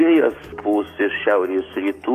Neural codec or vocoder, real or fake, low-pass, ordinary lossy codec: codec, 44.1 kHz, 7.8 kbps, DAC; fake; 14.4 kHz; AAC, 64 kbps